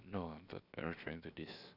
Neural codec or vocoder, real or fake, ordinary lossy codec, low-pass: codec, 16 kHz, 0.7 kbps, FocalCodec; fake; none; 5.4 kHz